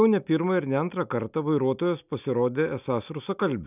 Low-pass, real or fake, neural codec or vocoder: 3.6 kHz; real; none